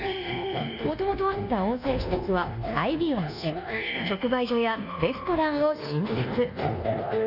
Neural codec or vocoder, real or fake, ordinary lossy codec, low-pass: codec, 24 kHz, 1.2 kbps, DualCodec; fake; none; 5.4 kHz